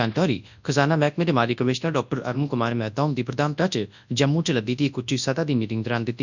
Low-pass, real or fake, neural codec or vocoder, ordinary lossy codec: 7.2 kHz; fake; codec, 24 kHz, 0.9 kbps, WavTokenizer, large speech release; none